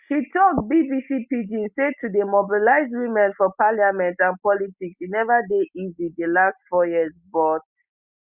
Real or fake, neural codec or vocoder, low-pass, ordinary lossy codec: real; none; 3.6 kHz; Opus, 64 kbps